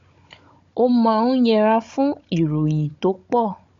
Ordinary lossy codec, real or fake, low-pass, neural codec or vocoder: MP3, 48 kbps; fake; 7.2 kHz; codec, 16 kHz, 16 kbps, FunCodec, trained on Chinese and English, 50 frames a second